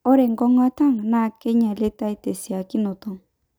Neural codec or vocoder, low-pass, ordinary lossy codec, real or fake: none; none; none; real